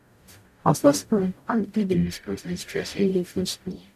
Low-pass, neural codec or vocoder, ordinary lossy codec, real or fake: 14.4 kHz; codec, 44.1 kHz, 0.9 kbps, DAC; none; fake